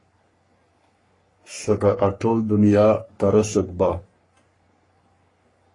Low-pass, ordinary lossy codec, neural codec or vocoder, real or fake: 10.8 kHz; AAC, 32 kbps; codec, 44.1 kHz, 3.4 kbps, Pupu-Codec; fake